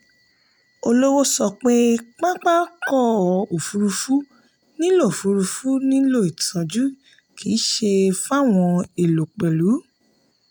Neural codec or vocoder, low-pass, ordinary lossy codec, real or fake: none; none; none; real